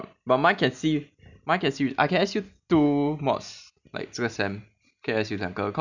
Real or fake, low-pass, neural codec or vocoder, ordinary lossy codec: real; 7.2 kHz; none; none